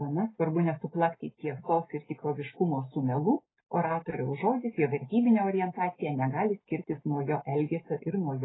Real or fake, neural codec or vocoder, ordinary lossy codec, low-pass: real; none; AAC, 16 kbps; 7.2 kHz